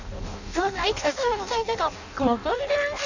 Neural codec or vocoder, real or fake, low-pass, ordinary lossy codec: codec, 16 kHz in and 24 kHz out, 0.6 kbps, FireRedTTS-2 codec; fake; 7.2 kHz; none